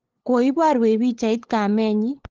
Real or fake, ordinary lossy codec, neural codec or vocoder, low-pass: fake; Opus, 16 kbps; codec, 16 kHz, 8 kbps, FunCodec, trained on LibriTTS, 25 frames a second; 7.2 kHz